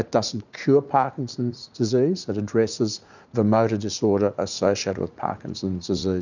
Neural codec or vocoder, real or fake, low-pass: vocoder, 44.1 kHz, 80 mel bands, Vocos; fake; 7.2 kHz